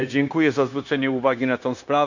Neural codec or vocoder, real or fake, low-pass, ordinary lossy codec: codec, 16 kHz, 0.9 kbps, LongCat-Audio-Codec; fake; 7.2 kHz; none